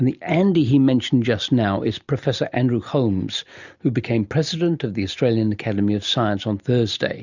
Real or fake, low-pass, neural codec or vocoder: real; 7.2 kHz; none